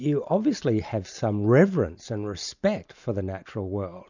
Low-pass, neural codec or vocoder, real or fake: 7.2 kHz; none; real